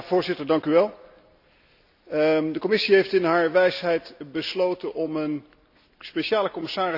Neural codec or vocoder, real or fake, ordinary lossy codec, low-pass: none; real; none; 5.4 kHz